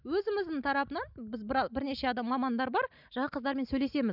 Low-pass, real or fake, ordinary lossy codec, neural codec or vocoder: 5.4 kHz; real; none; none